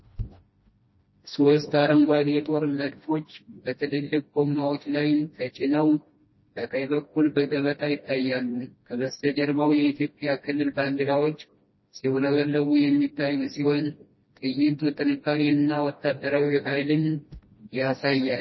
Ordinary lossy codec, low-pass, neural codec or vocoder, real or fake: MP3, 24 kbps; 7.2 kHz; codec, 16 kHz, 1 kbps, FreqCodec, smaller model; fake